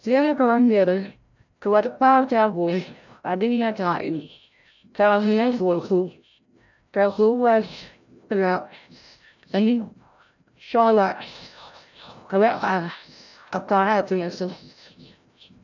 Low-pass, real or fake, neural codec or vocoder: 7.2 kHz; fake; codec, 16 kHz, 0.5 kbps, FreqCodec, larger model